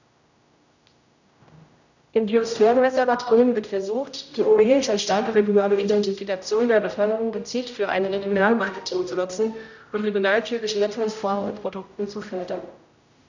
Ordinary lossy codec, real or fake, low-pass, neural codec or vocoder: none; fake; 7.2 kHz; codec, 16 kHz, 0.5 kbps, X-Codec, HuBERT features, trained on general audio